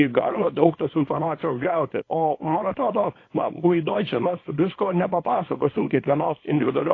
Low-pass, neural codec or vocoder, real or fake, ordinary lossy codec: 7.2 kHz; codec, 24 kHz, 0.9 kbps, WavTokenizer, small release; fake; AAC, 32 kbps